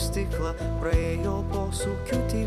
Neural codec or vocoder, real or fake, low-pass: vocoder, 44.1 kHz, 128 mel bands every 256 samples, BigVGAN v2; fake; 14.4 kHz